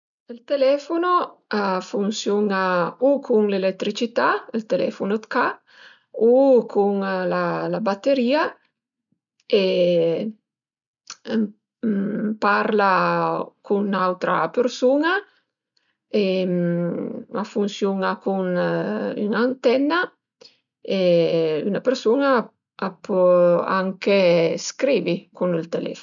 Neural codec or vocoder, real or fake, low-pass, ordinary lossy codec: none; real; 7.2 kHz; none